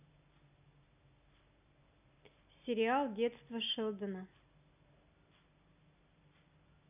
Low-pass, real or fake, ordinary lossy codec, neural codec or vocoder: 3.6 kHz; real; none; none